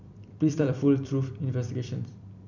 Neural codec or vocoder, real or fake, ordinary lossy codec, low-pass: vocoder, 44.1 kHz, 128 mel bands every 256 samples, BigVGAN v2; fake; none; 7.2 kHz